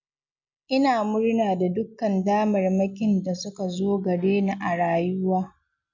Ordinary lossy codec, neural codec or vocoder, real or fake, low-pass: none; none; real; 7.2 kHz